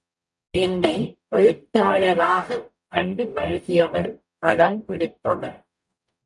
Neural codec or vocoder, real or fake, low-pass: codec, 44.1 kHz, 0.9 kbps, DAC; fake; 10.8 kHz